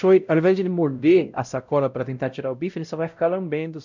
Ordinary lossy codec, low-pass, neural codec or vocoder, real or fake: none; 7.2 kHz; codec, 16 kHz, 0.5 kbps, X-Codec, WavLM features, trained on Multilingual LibriSpeech; fake